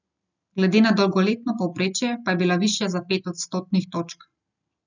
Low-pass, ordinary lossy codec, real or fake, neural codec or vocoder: 7.2 kHz; none; real; none